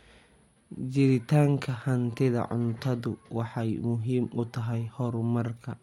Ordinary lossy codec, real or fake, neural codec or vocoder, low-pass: MP3, 64 kbps; real; none; 19.8 kHz